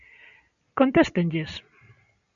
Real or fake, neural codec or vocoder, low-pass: real; none; 7.2 kHz